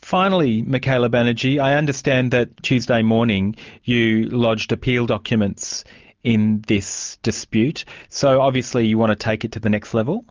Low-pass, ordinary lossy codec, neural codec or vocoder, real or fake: 7.2 kHz; Opus, 24 kbps; none; real